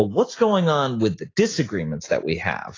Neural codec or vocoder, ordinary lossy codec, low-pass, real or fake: none; AAC, 32 kbps; 7.2 kHz; real